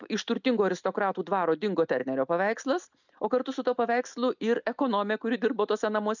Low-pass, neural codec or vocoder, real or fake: 7.2 kHz; none; real